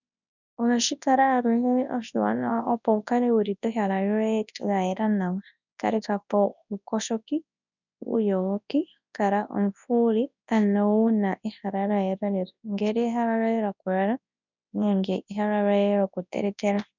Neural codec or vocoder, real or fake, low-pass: codec, 24 kHz, 0.9 kbps, WavTokenizer, large speech release; fake; 7.2 kHz